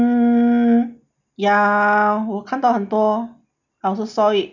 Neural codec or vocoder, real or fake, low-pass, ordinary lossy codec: none; real; 7.2 kHz; none